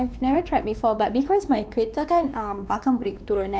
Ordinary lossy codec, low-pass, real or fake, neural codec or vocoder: none; none; fake; codec, 16 kHz, 2 kbps, X-Codec, WavLM features, trained on Multilingual LibriSpeech